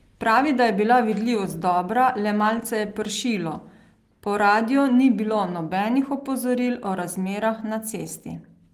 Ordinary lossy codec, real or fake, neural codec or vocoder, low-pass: Opus, 24 kbps; real; none; 14.4 kHz